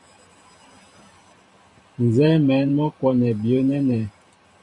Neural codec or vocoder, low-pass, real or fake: vocoder, 44.1 kHz, 128 mel bands every 512 samples, BigVGAN v2; 10.8 kHz; fake